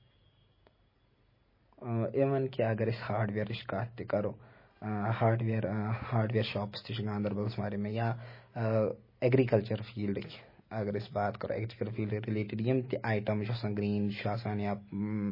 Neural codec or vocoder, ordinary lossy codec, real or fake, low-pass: none; MP3, 24 kbps; real; 5.4 kHz